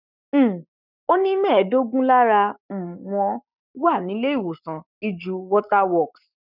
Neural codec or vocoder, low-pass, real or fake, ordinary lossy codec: codec, 44.1 kHz, 7.8 kbps, Pupu-Codec; 5.4 kHz; fake; none